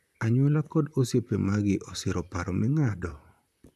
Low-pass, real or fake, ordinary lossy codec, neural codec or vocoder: 14.4 kHz; fake; none; vocoder, 44.1 kHz, 128 mel bands, Pupu-Vocoder